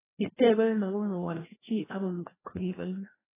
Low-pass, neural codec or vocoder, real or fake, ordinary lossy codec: 3.6 kHz; codec, 16 kHz, 1 kbps, FreqCodec, larger model; fake; AAC, 16 kbps